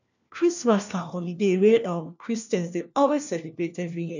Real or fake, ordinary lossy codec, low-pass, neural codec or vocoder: fake; none; 7.2 kHz; codec, 16 kHz, 1 kbps, FunCodec, trained on LibriTTS, 50 frames a second